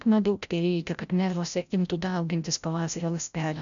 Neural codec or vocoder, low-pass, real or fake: codec, 16 kHz, 0.5 kbps, FreqCodec, larger model; 7.2 kHz; fake